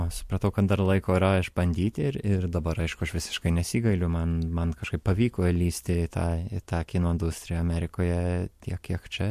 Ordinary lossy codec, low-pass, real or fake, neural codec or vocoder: MP3, 64 kbps; 14.4 kHz; real; none